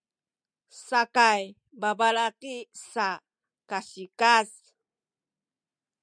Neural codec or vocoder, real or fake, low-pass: none; real; 9.9 kHz